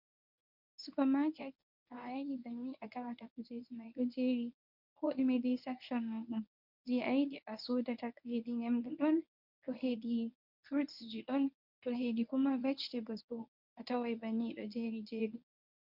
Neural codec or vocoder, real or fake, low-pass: codec, 24 kHz, 0.9 kbps, WavTokenizer, medium speech release version 1; fake; 5.4 kHz